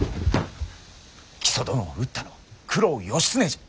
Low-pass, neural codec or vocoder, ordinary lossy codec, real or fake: none; none; none; real